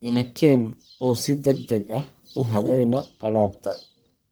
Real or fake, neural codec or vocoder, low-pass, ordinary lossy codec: fake; codec, 44.1 kHz, 1.7 kbps, Pupu-Codec; none; none